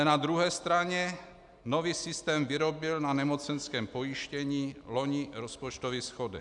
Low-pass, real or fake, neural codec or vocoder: 10.8 kHz; real; none